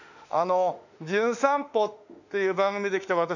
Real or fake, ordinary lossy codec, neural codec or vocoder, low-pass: fake; none; autoencoder, 48 kHz, 32 numbers a frame, DAC-VAE, trained on Japanese speech; 7.2 kHz